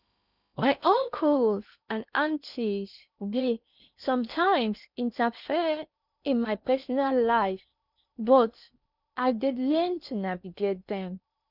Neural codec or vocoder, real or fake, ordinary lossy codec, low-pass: codec, 16 kHz in and 24 kHz out, 0.8 kbps, FocalCodec, streaming, 65536 codes; fake; none; 5.4 kHz